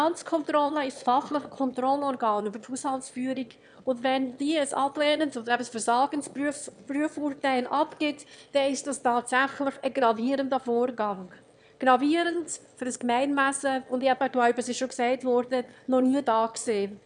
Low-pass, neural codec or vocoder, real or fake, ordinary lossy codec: 9.9 kHz; autoencoder, 22.05 kHz, a latent of 192 numbers a frame, VITS, trained on one speaker; fake; none